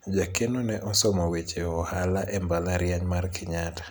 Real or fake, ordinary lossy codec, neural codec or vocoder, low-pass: real; none; none; none